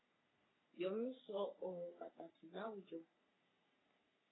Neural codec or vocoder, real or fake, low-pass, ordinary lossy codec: codec, 44.1 kHz, 3.4 kbps, Pupu-Codec; fake; 7.2 kHz; AAC, 16 kbps